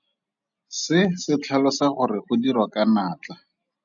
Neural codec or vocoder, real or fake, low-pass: none; real; 7.2 kHz